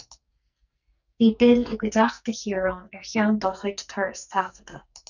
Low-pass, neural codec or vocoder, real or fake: 7.2 kHz; codec, 44.1 kHz, 2.6 kbps, SNAC; fake